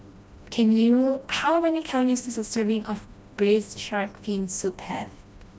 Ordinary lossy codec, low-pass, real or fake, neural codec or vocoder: none; none; fake; codec, 16 kHz, 1 kbps, FreqCodec, smaller model